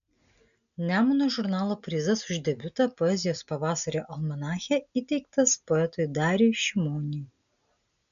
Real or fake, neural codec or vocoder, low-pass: real; none; 7.2 kHz